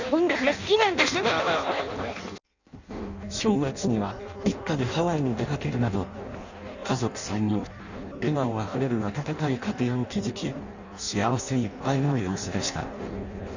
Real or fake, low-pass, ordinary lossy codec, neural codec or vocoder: fake; 7.2 kHz; none; codec, 16 kHz in and 24 kHz out, 0.6 kbps, FireRedTTS-2 codec